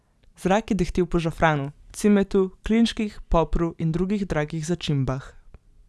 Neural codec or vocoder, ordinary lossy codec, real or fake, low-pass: none; none; real; none